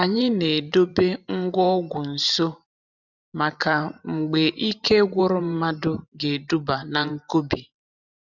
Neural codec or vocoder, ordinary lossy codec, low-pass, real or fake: vocoder, 44.1 kHz, 128 mel bands every 512 samples, BigVGAN v2; none; 7.2 kHz; fake